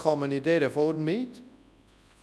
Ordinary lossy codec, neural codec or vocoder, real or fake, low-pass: none; codec, 24 kHz, 0.9 kbps, WavTokenizer, large speech release; fake; none